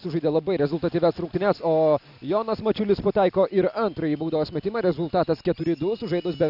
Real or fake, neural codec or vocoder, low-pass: real; none; 5.4 kHz